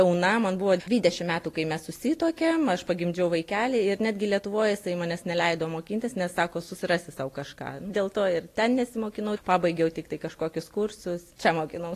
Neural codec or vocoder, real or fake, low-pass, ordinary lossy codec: none; real; 14.4 kHz; AAC, 48 kbps